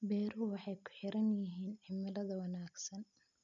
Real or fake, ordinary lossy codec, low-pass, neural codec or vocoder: real; none; 7.2 kHz; none